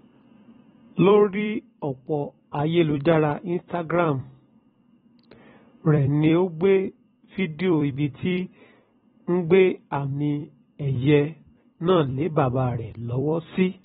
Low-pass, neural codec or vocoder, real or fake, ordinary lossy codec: 19.8 kHz; vocoder, 44.1 kHz, 128 mel bands every 256 samples, BigVGAN v2; fake; AAC, 16 kbps